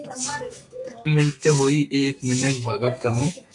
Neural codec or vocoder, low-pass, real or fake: codec, 32 kHz, 1.9 kbps, SNAC; 10.8 kHz; fake